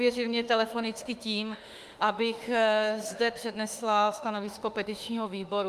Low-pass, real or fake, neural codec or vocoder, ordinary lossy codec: 14.4 kHz; fake; autoencoder, 48 kHz, 32 numbers a frame, DAC-VAE, trained on Japanese speech; Opus, 32 kbps